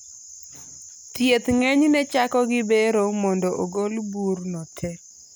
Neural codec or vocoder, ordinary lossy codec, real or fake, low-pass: none; none; real; none